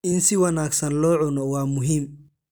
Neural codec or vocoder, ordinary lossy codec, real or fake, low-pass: none; none; real; none